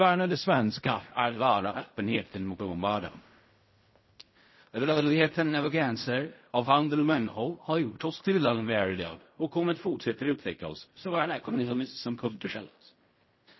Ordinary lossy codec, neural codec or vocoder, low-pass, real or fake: MP3, 24 kbps; codec, 16 kHz in and 24 kHz out, 0.4 kbps, LongCat-Audio-Codec, fine tuned four codebook decoder; 7.2 kHz; fake